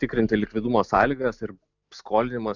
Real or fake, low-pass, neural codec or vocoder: real; 7.2 kHz; none